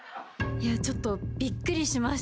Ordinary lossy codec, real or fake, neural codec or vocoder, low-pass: none; real; none; none